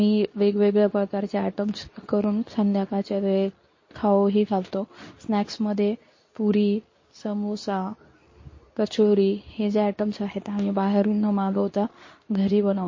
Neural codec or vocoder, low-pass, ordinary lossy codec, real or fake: codec, 24 kHz, 0.9 kbps, WavTokenizer, medium speech release version 2; 7.2 kHz; MP3, 32 kbps; fake